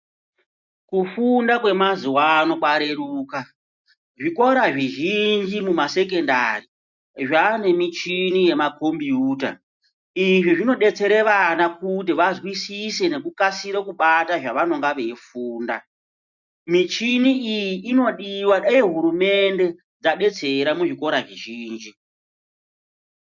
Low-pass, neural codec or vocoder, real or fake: 7.2 kHz; none; real